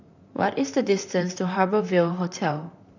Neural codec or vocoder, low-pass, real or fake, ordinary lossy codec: vocoder, 44.1 kHz, 128 mel bands, Pupu-Vocoder; 7.2 kHz; fake; none